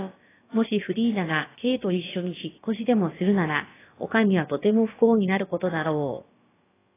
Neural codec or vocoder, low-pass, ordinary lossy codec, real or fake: codec, 16 kHz, about 1 kbps, DyCAST, with the encoder's durations; 3.6 kHz; AAC, 16 kbps; fake